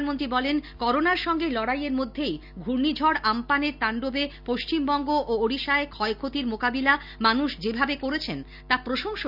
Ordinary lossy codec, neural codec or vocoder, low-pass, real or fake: none; none; 5.4 kHz; real